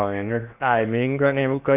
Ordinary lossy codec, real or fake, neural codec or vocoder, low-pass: none; fake; codec, 16 kHz in and 24 kHz out, 0.8 kbps, FocalCodec, streaming, 65536 codes; 3.6 kHz